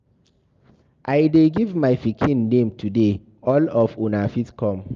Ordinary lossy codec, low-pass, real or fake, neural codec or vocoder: Opus, 32 kbps; 7.2 kHz; real; none